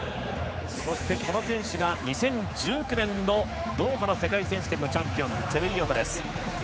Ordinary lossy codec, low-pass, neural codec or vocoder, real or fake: none; none; codec, 16 kHz, 4 kbps, X-Codec, HuBERT features, trained on general audio; fake